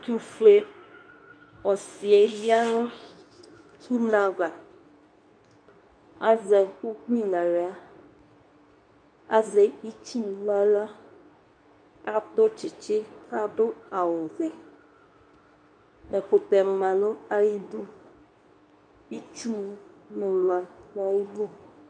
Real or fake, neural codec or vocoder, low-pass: fake; codec, 24 kHz, 0.9 kbps, WavTokenizer, medium speech release version 2; 9.9 kHz